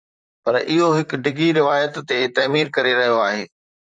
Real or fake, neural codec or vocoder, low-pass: fake; vocoder, 44.1 kHz, 128 mel bands, Pupu-Vocoder; 9.9 kHz